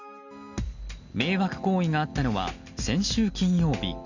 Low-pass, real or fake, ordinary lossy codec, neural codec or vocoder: 7.2 kHz; real; none; none